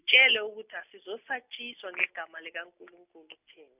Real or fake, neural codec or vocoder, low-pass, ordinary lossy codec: real; none; 3.6 kHz; none